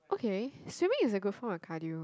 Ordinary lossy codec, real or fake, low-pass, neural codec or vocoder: none; real; none; none